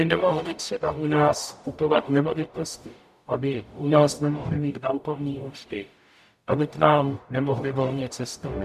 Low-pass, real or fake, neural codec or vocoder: 14.4 kHz; fake; codec, 44.1 kHz, 0.9 kbps, DAC